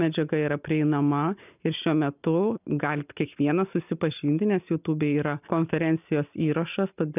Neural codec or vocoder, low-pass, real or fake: none; 3.6 kHz; real